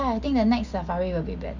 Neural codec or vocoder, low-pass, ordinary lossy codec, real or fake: none; 7.2 kHz; none; real